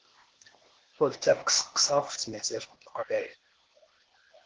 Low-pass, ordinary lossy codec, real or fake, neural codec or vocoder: 7.2 kHz; Opus, 16 kbps; fake; codec, 16 kHz, 0.8 kbps, ZipCodec